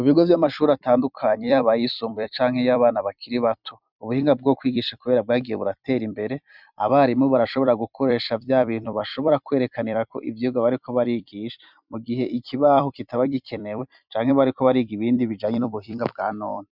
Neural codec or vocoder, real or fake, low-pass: vocoder, 44.1 kHz, 128 mel bands every 256 samples, BigVGAN v2; fake; 5.4 kHz